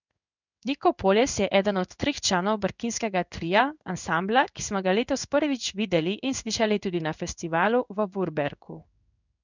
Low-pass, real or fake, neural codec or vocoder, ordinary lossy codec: 7.2 kHz; fake; codec, 16 kHz in and 24 kHz out, 1 kbps, XY-Tokenizer; none